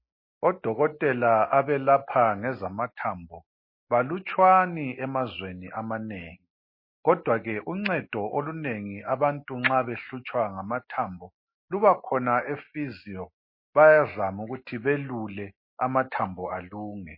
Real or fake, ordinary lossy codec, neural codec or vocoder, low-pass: real; MP3, 24 kbps; none; 5.4 kHz